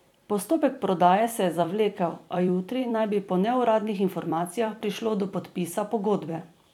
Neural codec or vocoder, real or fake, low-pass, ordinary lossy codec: vocoder, 44.1 kHz, 128 mel bands every 256 samples, BigVGAN v2; fake; 19.8 kHz; none